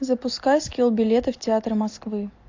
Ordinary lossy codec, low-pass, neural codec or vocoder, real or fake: AAC, 48 kbps; 7.2 kHz; none; real